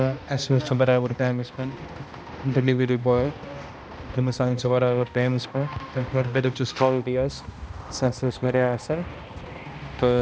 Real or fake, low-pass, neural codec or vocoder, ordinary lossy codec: fake; none; codec, 16 kHz, 1 kbps, X-Codec, HuBERT features, trained on balanced general audio; none